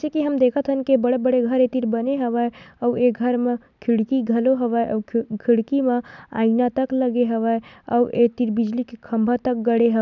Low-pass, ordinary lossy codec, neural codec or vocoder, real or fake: 7.2 kHz; none; none; real